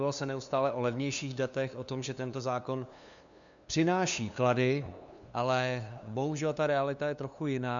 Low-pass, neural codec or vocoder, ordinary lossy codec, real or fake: 7.2 kHz; codec, 16 kHz, 2 kbps, FunCodec, trained on LibriTTS, 25 frames a second; MP3, 96 kbps; fake